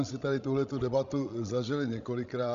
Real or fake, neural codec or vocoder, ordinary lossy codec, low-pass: fake; codec, 16 kHz, 16 kbps, FreqCodec, larger model; Opus, 64 kbps; 7.2 kHz